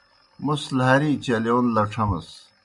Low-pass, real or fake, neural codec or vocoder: 10.8 kHz; real; none